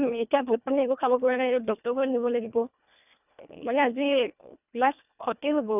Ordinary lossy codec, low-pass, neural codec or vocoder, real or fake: none; 3.6 kHz; codec, 24 kHz, 1.5 kbps, HILCodec; fake